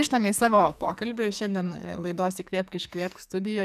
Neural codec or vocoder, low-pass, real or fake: codec, 44.1 kHz, 2.6 kbps, SNAC; 14.4 kHz; fake